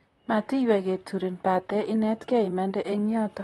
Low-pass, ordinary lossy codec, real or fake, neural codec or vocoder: 19.8 kHz; AAC, 32 kbps; fake; vocoder, 44.1 kHz, 128 mel bands, Pupu-Vocoder